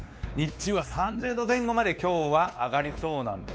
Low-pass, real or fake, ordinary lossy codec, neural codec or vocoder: none; fake; none; codec, 16 kHz, 2 kbps, X-Codec, WavLM features, trained on Multilingual LibriSpeech